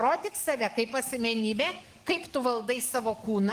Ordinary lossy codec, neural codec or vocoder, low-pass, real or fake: Opus, 24 kbps; codec, 44.1 kHz, 7.8 kbps, DAC; 14.4 kHz; fake